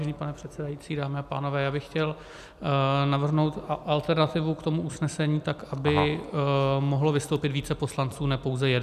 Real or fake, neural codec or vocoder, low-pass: real; none; 14.4 kHz